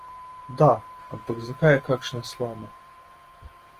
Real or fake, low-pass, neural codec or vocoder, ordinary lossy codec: fake; 14.4 kHz; vocoder, 48 kHz, 128 mel bands, Vocos; Opus, 24 kbps